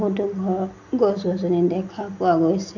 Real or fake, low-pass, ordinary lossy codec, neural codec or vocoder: real; 7.2 kHz; none; none